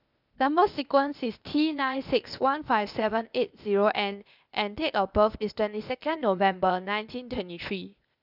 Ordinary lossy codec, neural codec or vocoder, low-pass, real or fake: none; codec, 16 kHz, 0.8 kbps, ZipCodec; 5.4 kHz; fake